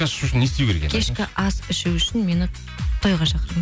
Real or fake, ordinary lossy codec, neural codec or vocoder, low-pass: real; none; none; none